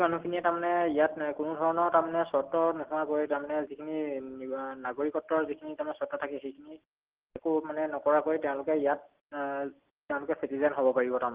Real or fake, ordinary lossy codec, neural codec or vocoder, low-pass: real; Opus, 16 kbps; none; 3.6 kHz